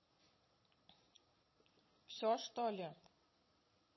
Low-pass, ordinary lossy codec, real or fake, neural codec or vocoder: 7.2 kHz; MP3, 24 kbps; fake; vocoder, 22.05 kHz, 80 mel bands, Vocos